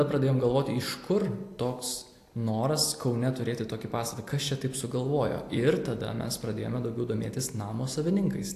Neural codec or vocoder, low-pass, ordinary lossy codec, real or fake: none; 14.4 kHz; AAC, 64 kbps; real